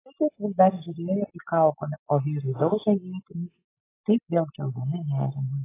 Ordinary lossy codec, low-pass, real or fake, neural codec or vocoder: AAC, 16 kbps; 3.6 kHz; real; none